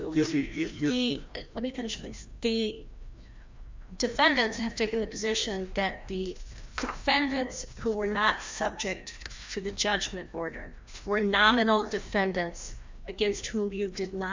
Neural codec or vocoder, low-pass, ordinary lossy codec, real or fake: codec, 16 kHz, 1 kbps, FreqCodec, larger model; 7.2 kHz; MP3, 64 kbps; fake